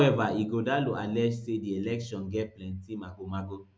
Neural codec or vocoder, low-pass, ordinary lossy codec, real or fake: none; none; none; real